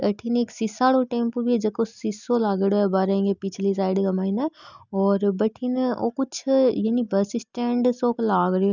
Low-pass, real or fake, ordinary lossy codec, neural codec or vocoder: 7.2 kHz; real; none; none